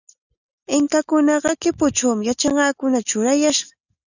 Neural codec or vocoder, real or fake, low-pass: none; real; 7.2 kHz